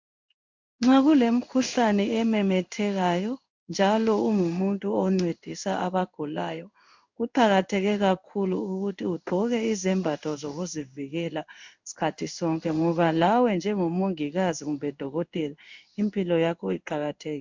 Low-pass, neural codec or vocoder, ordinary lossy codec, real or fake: 7.2 kHz; codec, 16 kHz in and 24 kHz out, 1 kbps, XY-Tokenizer; MP3, 64 kbps; fake